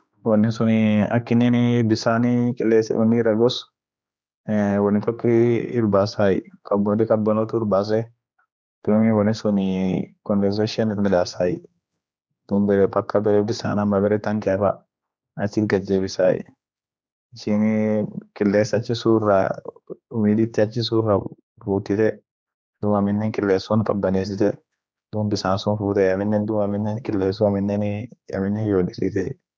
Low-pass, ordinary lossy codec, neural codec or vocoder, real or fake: none; none; codec, 16 kHz, 2 kbps, X-Codec, HuBERT features, trained on general audio; fake